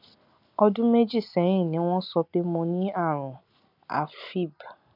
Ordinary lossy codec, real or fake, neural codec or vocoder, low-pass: none; real; none; 5.4 kHz